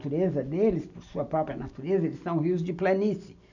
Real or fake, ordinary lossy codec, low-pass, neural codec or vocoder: real; none; 7.2 kHz; none